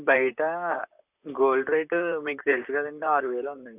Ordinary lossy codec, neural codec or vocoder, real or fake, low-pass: none; vocoder, 44.1 kHz, 128 mel bands, Pupu-Vocoder; fake; 3.6 kHz